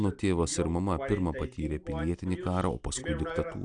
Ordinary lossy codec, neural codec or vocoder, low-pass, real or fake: AAC, 64 kbps; none; 9.9 kHz; real